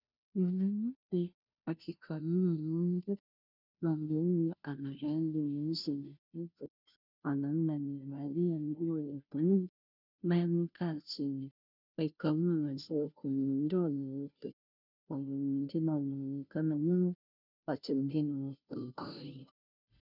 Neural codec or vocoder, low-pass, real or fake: codec, 16 kHz, 0.5 kbps, FunCodec, trained on Chinese and English, 25 frames a second; 5.4 kHz; fake